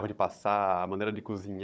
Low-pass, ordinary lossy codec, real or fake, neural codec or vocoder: none; none; fake; codec, 16 kHz, 16 kbps, FunCodec, trained on Chinese and English, 50 frames a second